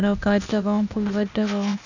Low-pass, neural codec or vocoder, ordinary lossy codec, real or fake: 7.2 kHz; codec, 16 kHz, 0.8 kbps, ZipCodec; none; fake